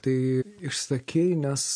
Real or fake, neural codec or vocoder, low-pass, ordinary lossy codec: real; none; 9.9 kHz; MP3, 48 kbps